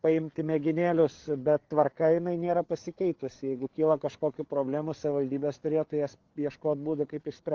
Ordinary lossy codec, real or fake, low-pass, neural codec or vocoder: Opus, 16 kbps; fake; 7.2 kHz; codec, 16 kHz, 8 kbps, FreqCodec, larger model